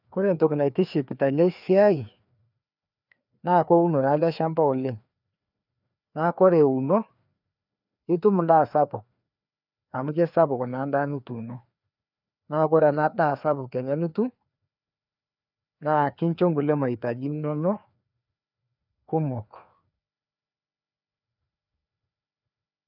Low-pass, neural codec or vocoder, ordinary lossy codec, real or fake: 5.4 kHz; codec, 16 kHz, 2 kbps, FreqCodec, larger model; none; fake